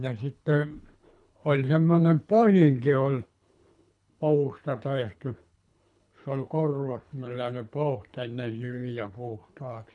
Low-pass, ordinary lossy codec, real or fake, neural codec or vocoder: 10.8 kHz; none; fake; codec, 24 kHz, 3 kbps, HILCodec